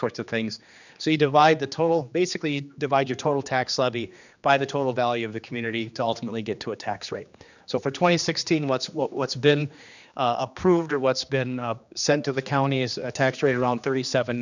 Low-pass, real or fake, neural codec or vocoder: 7.2 kHz; fake; codec, 16 kHz, 2 kbps, X-Codec, HuBERT features, trained on general audio